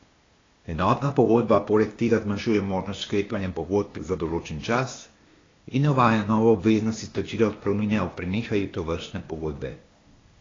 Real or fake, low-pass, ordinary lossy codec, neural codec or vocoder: fake; 7.2 kHz; AAC, 32 kbps; codec, 16 kHz, 0.8 kbps, ZipCodec